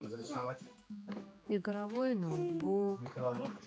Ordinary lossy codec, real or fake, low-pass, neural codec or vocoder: none; fake; none; codec, 16 kHz, 2 kbps, X-Codec, HuBERT features, trained on general audio